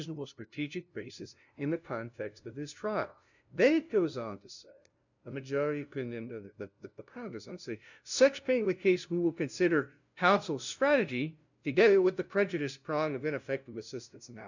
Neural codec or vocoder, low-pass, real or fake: codec, 16 kHz, 0.5 kbps, FunCodec, trained on LibriTTS, 25 frames a second; 7.2 kHz; fake